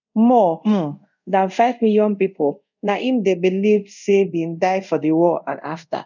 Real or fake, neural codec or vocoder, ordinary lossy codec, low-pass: fake; codec, 24 kHz, 0.5 kbps, DualCodec; none; 7.2 kHz